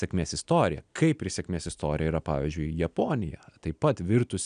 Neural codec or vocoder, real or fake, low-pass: none; real; 9.9 kHz